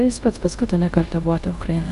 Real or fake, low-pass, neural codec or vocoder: fake; 10.8 kHz; codec, 24 kHz, 0.5 kbps, DualCodec